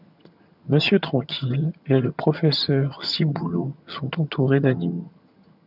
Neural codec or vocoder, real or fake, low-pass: vocoder, 22.05 kHz, 80 mel bands, HiFi-GAN; fake; 5.4 kHz